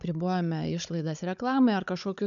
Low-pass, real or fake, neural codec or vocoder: 7.2 kHz; fake; codec, 16 kHz, 16 kbps, FunCodec, trained on Chinese and English, 50 frames a second